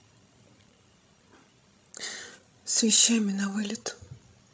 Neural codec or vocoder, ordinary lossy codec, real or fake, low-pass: codec, 16 kHz, 16 kbps, FreqCodec, larger model; none; fake; none